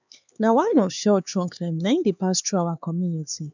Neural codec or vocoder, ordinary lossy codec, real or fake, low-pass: codec, 16 kHz, 4 kbps, X-Codec, HuBERT features, trained on LibriSpeech; none; fake; 7.2 kHz